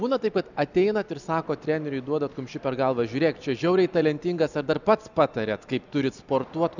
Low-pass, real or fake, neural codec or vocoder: 7.2 kHz; real; none